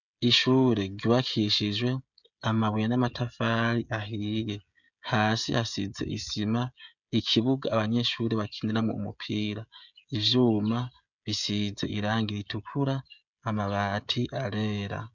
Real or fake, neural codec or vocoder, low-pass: fake; codec, 16 kHz, 16 kbps, FreqCodec, smaller model; 7.2 kHz